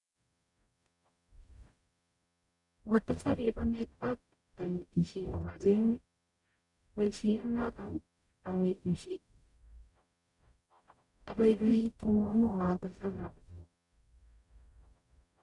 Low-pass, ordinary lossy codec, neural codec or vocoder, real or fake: 10.8 kHz; none; codec, 44.1 kHz, 0.9 kbps, DAC; fake